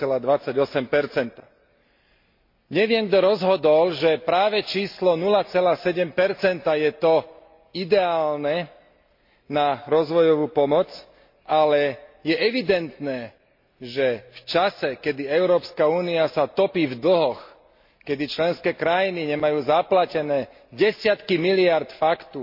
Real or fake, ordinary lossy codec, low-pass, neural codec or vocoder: real; MP3, 32 kbps; 5.4 kHz; none